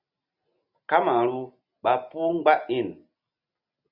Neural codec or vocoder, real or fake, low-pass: none; real; 5.4 kHz